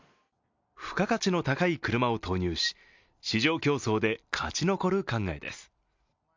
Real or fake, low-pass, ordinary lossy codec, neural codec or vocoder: real; 7.2 kHz; MP3, 64 kbps; none